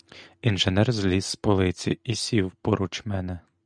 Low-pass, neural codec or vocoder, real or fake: 9.9 kHz; none; real